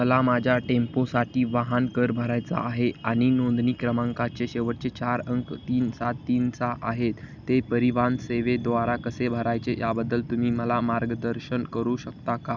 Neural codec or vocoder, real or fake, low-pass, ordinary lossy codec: none; real; 7.2 kHz; none